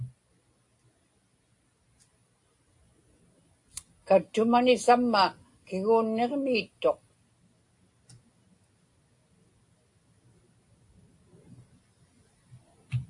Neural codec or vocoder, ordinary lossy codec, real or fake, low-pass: none; AAC, 48 kbps; real; 10.8 kHz